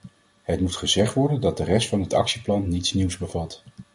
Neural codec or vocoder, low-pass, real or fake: none; 10.8 kHz; real